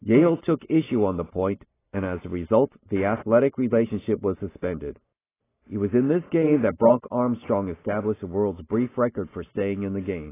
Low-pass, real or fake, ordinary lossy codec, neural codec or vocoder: 3.6 kHz; real; AAC, 16 kbps; none